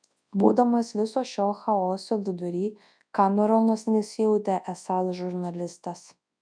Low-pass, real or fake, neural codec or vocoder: 9.9 kHz; fake; codec, 24 kHz, 0.9 kbps, WavTokenizer, large speech release